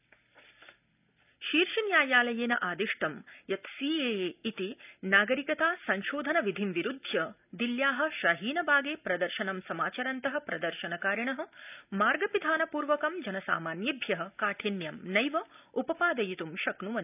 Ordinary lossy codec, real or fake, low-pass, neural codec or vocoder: none; real; 3.6 kHz; none